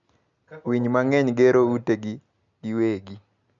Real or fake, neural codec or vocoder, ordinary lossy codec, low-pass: real; none; none; 7.2 kHz